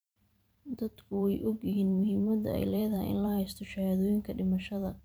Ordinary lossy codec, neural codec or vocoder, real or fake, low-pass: none; vocoder, 44.1 kHz, 128 mel bands every 512 samples, BigVGAN v2; fake; none